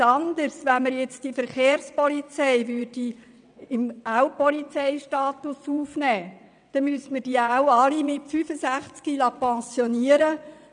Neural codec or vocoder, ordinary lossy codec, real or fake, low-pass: vocoder, 22.05 kHz, 80 mel bands, WaveNeXt; none; fake; 9.9 kHz